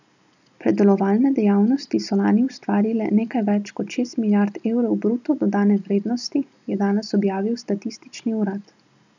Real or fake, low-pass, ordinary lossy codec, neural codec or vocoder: real; 7.2 kHz; none; none